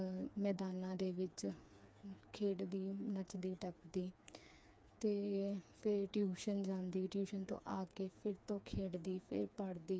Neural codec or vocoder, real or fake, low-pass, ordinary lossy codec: codec, 16 kHz, 4 kbps, FreqCodec, smaller model; fake; none; none